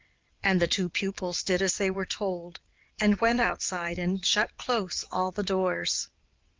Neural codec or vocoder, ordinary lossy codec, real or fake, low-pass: vocoder, 22.05 kHz, 80 mel bands, WaveNeXt; Opus, 32 kbps; fake; 7.2 kHz